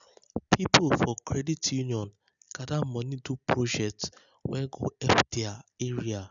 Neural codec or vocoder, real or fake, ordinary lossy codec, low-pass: none; real; none; 7.2 kHz